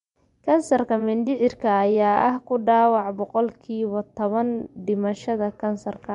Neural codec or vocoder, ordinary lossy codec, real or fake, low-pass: vocoder, 24 kHz, 100 mel bands, Vocos; none; fake; 10.8 kHz